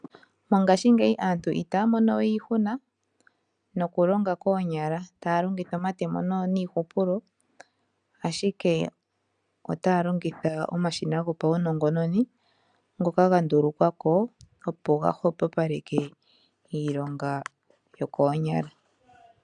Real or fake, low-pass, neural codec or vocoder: real; 9.9 kHz; none